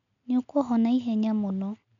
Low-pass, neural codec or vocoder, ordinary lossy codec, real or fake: 7.2 kHz; none; none; real